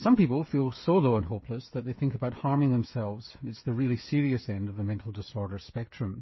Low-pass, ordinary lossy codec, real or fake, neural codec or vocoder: 7.2 kHz; MP3, 24 kbps; fake; codec, 16 kHz, 8 kbps, FreqCodec, smaller model